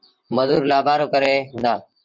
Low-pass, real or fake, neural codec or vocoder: 7.2 kHz; fake; vocoder, 44.1 kHz, 128 mel bands, Pupu-Vocoder